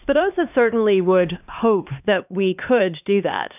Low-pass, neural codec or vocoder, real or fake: 3.6 kHz; codec, 16 kHz, 2 kbps, X-Codec, HuBERT features, trained on LibriSpeech; fake